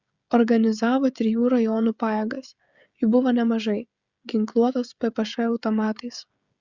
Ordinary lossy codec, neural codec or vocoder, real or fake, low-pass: Opus, 64 kbps; codec, 16 kHz, 16 kbps, FreqCodec, smaller model; fake; 7.2 kHz